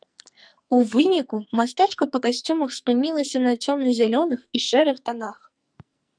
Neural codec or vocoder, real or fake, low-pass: codec, 44.1 kHz, 2.6 kbps, SNAC; fake; 9.9 kHz